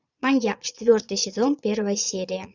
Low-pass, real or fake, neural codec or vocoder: 7.2 kHz; fake; vocoder, 22.05 kHz, 80 mel bands, Vocos